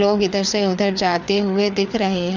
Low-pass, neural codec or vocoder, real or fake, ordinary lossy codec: 7.2 kHz; codec, 16 kHz, 4 kbps, FreqCodec, larger model; fake; none